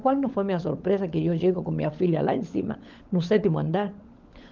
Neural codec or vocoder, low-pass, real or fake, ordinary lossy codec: codec, 16 kHz, 16 kbps, FunCodec, trained on LibriTTS, 50 frames a second; 7.2 kHz; fake; Opus, 32 kbps